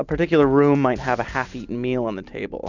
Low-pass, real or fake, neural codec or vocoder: 7.2 kHz; real; none